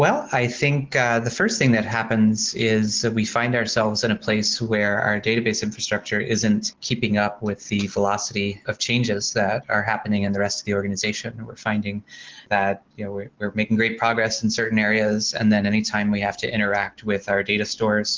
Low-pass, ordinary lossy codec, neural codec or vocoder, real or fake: 7.2 kHz; Opus, 16 kbps; none; real